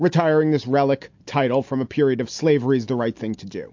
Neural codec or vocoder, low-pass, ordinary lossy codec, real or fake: none; 7.2 kHz; MP3, 48 kbps; real